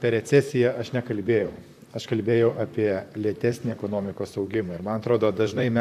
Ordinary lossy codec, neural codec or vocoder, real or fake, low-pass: AAC, 96 kbps; vocoder, 44.1 kHz, 128 mel bands, Pupu-Vocoder; fake; 14.4 kHz